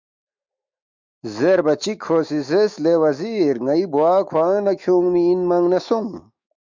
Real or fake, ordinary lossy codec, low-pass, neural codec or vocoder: fake; MP3, 64 kbps; 7.2 kHz; autoencoder, 48 kHz, 128 numbers a frame, DAC-VAE, trained on Japanese speech